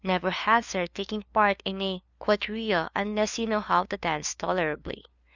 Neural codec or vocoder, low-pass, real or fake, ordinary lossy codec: codec, 16 kHz, 2 kbps, FunCodec, trained on LibriTTS, 25 frames a second; 7.2 kHz; fake; Opus, 64 kbps